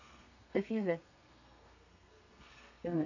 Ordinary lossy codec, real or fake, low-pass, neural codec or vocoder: none; fake; 7.2 kHz; codec, 32 kHz, 1.9 kbps, SNAC